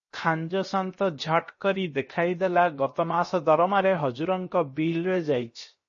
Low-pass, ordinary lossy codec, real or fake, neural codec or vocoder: 7.2 kHz; MP3, 32 kbps; fake; codec, 16 kHz, 0.7 kbps, FocalCodec